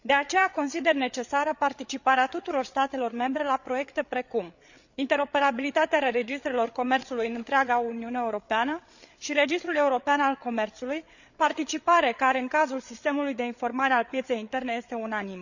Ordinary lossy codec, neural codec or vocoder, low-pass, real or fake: none; codec, 16 kHz, 8 kbps, FreqCodec, larger model; 7.2 kHz; fake